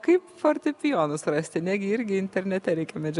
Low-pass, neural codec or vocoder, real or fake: 10.8 kHz; none; real